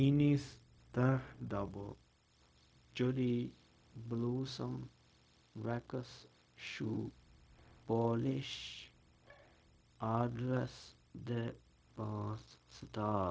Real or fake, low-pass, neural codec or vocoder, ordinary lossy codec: fake; none; codec, 16 kHz, 0.4 kbps, LongCat-Audio-Codec; none